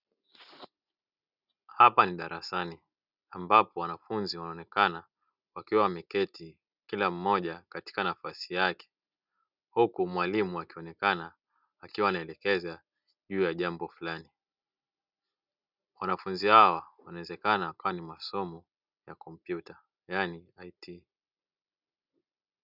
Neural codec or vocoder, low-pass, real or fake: none; 5.4 kHz; real